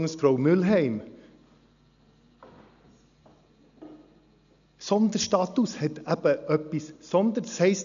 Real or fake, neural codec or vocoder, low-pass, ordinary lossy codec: real; none; 7.2 kHz; MP3, 96 kbps